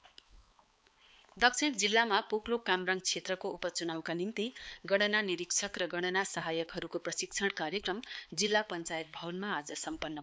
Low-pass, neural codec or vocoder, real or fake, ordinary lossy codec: none; codec, 16 kHz, 4 kbps, X-Codec, HuBERT features, trained on balanced general audio; fake; none